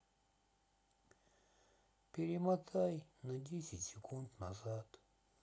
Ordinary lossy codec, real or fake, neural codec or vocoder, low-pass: none; real; none; none